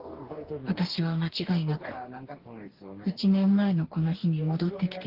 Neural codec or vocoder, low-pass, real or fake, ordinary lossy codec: codec, 44.1 kHz, 2.6 kbps, DAC; 5.4 kHz; fake; Opus, 16 kbps